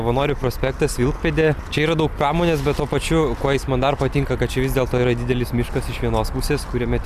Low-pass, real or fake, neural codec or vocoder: 14.4 kHz; real; none